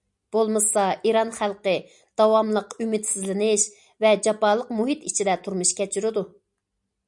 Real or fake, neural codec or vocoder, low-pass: real; none; 10.8 kHz